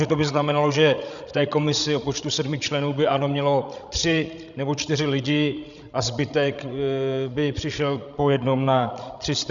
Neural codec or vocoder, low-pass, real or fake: codec, 16 kHz, 16 kbps, FreqCodec, larger model; 7.2 kHz; fake